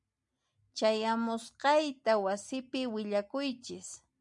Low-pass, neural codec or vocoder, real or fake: 10.8 kHz; none; real